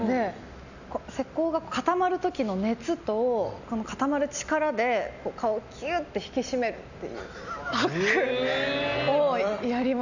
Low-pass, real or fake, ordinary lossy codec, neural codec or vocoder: 7.2 kHz; real; none; none